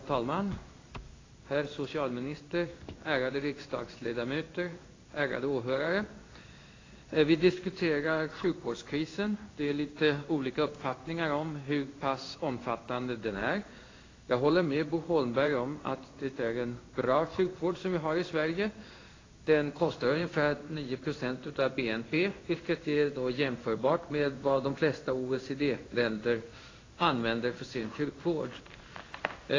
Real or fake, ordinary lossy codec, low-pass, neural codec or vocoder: fake; AAC, 32 kbps; 7.2 kHz; codec, 16 kHz in and 24 kHz out, 1 kbps, XY-Tokenizer